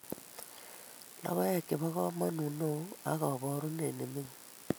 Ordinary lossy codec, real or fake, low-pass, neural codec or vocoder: none; real; none; none